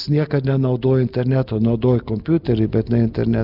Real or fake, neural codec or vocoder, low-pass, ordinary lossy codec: real; none; 5.4 kHz; Opus, 32 kbps